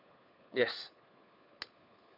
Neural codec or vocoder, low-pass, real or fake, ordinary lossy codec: codec, 16 kHz, 4 kbps, FunCodec, trained on LibriTTS, 50 frames a second; 5.4 kHz; fake; none